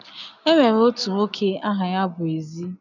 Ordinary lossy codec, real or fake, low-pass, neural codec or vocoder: none; real; 7.2 kHz; none